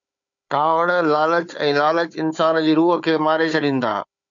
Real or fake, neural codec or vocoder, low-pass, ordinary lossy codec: fake; codec, 16 kHz, 4 kbps, FunCodec, trained on Chinese and English, 50 frames a second; 7.2 kHz; MP3, 64 kbps